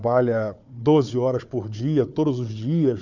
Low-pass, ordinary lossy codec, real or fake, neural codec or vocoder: 7.2 kHz; none; fake; codec, 16 kHz, 4 kbps, FunCodec, trained on Chinese and English, 50 frames a second